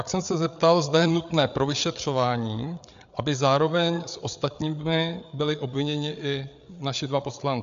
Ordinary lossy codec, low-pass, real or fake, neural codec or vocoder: MP3, 64 kbps; 7.2 kHz; fake; codec, 16 kHz, 8 kbps, FreqCodec, larger model